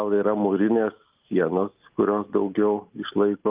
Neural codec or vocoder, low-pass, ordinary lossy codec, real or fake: none; 3.6 kHz; Opus, 32 kbps; real